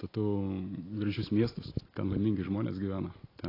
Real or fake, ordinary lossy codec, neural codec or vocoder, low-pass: real; AAC, 24 kbps; none; 5.4 kHz